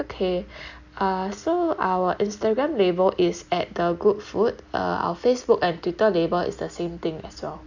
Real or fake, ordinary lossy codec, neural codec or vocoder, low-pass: real; none; none; 7.2 kHz